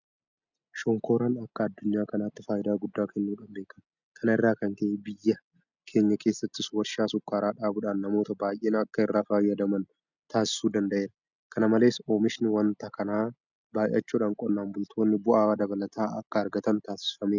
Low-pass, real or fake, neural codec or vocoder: 7.2 kHz; real; none